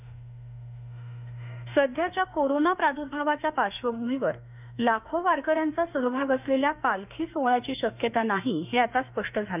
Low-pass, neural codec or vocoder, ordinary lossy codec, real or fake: 3.6 kHz; autoencoder, 48 kHz, 32 numbers a frame, DAC-VAE, trained on Japanese speech; none; fake